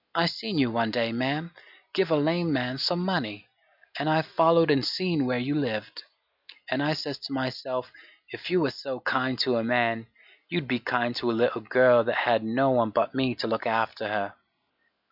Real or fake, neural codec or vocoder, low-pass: real; none; 5.4 kHz